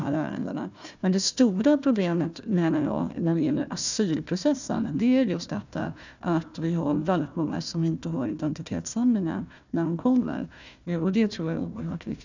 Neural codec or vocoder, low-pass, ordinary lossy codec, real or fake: codec, 16 kHz, 1 kbps, FunCodec, trained on Chinese and English, 50 frames a second; 7.2 kHz; none; fake